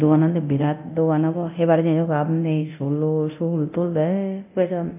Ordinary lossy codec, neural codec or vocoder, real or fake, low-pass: AAC, 32 kbps; codec, 24 kHz, 0.9 kbps, DualCodec; fake; 3.6 kHz